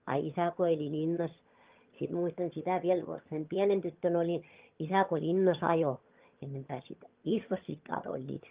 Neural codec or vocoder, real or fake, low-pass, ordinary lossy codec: vocoder, 22.05 kHz, 80 mel bands, HiFi-GAN; fake; 3.6 kHz; Opus, 32 kbps